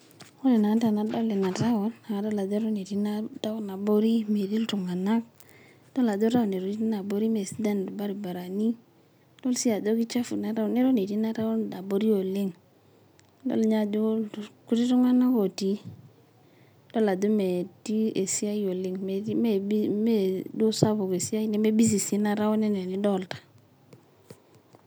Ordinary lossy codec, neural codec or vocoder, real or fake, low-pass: none; none; real; none